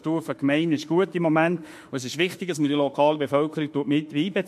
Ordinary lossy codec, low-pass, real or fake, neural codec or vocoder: MP3, 64 kbps; 14.4 kHz; fake; autoencoder, 48 kHz, 32 numbers a frame, DAC-VAE, trained on Japanese speech